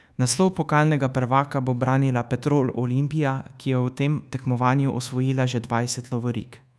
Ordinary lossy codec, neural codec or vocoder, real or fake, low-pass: none; codec, 24 kHz, 1.2 kbps, DualCodec; fake; none